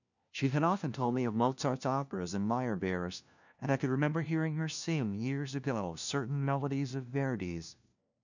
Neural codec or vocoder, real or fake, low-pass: codec, 16 kHz, 1 kbps, FunCodec, trained on LibriTTS, 50 frames a second; fake; 7.2 kHz